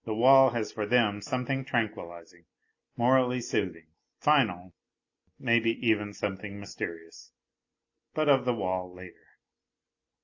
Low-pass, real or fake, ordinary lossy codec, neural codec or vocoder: 7.2 kHz; real; AAC, 48 kbps; none